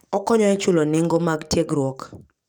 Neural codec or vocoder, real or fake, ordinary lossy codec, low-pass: codec, 44.1 kHz, 7.8 kbps, DAC; fake; none; 19.8 kHz